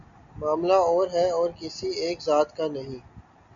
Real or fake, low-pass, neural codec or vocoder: real; 7.2 kHz; none